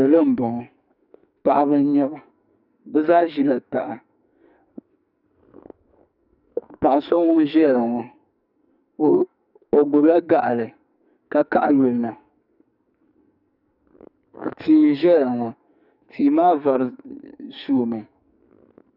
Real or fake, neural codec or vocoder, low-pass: fake; codec, 44.1 kHz, 2.6 kbps, SNAC; 5.4 kHz